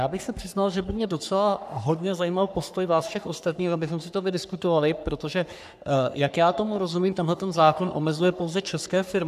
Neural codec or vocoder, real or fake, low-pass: codec, 44.1 kHz, 3.4 kbps, Pupu-Codec; fake; 14.4 kHz